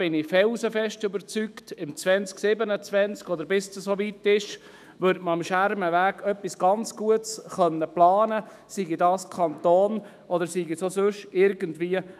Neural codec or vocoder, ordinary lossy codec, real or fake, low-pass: autoencoder, 48 kHz, 128 numbers a frame, DAC-VAE, trained on Japanese speech; none; fake; 14.4 kHz